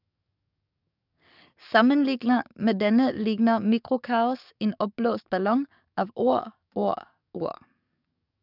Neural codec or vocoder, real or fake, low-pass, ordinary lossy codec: codec, 44.1 kHz, 7.8 kbps, DAC; fake; 5.4 kHz; none